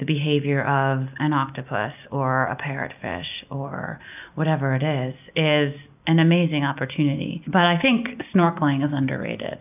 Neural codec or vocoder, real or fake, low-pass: none; real; 3.6 kHz